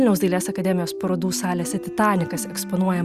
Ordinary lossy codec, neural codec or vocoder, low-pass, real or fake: Opus, 64 kbps; none; 14.4 kHz; real